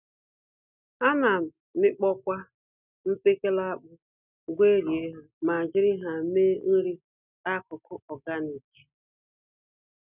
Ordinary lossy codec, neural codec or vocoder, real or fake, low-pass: none; none; real; 3.6 kHz